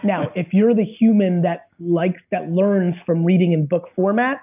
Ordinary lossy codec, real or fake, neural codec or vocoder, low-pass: AAC, 32 kbps; real; none; 3.6 kHz